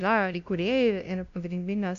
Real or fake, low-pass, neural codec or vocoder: fake; 7.2 kHz; codec, 16 kHz, 0.5 kbps, FunCodec, trained on LibriTTS, 25 frames a second